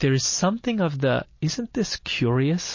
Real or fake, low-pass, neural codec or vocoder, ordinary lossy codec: real; 7.2 kHz; none; MP3, 32 kbps